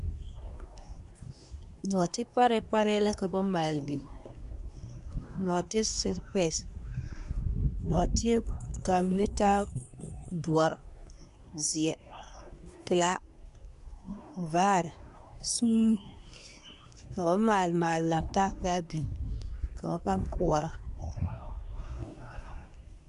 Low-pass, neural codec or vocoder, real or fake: 10.8 kHz; codec, 24 kHz, 1 kbps, SNAC; fake